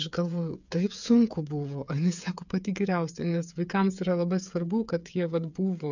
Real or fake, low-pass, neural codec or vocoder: fake; 7.2 kHz; codec, 16 kHz, 8 kbps, FreqCodec, smaller model